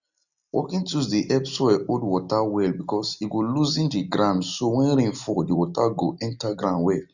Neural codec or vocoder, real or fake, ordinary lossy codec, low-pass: none; real; none; 7.2 kHz